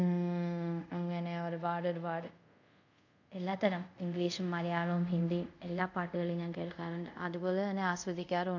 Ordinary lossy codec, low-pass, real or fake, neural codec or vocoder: none; 7.2 kHz; fake; codec, 24 kHz, 0.5 kbps, DualCodec